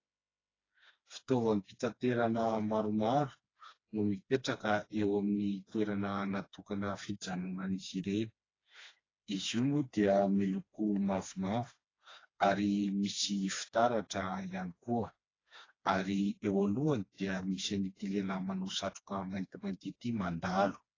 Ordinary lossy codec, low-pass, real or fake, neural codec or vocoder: AAC, 32 kbps; 7.2 kHz; fake; codec, 16 kHz, 2 kbps, FreqCodec, smaller model